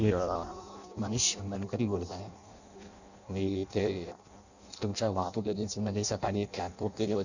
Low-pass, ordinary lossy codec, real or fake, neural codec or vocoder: 7.2 kHz; none; fake; codec, 16 kHz in and 24 kHz out, 0.6 kbps, FireRedTTS-2 codec